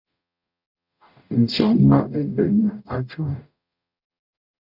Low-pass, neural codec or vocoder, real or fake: 5.4 kHz; codec, 44.1 kHz, 0.9 kbps, DAC; fake